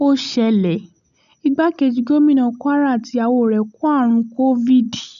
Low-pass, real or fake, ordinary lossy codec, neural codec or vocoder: 7.2 kHz; real; none; none